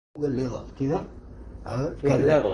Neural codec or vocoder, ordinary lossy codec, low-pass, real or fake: vocoder, 44.1 kHz, 128 mel bands, Pupu-Vocoder; Opus, 64 kbps; 10.8 kHz; fake